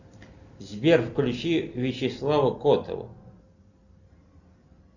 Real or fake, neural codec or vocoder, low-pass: real; none; 7.2 kHz